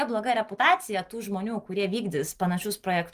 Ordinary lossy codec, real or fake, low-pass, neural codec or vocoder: Opus, 32 kbps; real; 14.4 kHz; none